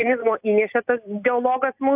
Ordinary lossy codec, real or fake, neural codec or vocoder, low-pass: AAC, 32 kbps; real; none; 3.6 kHz